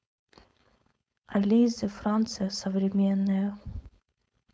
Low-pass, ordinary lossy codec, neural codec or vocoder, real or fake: none; none; codec, 16 kHz, 4.8 kbps, FACodec; fake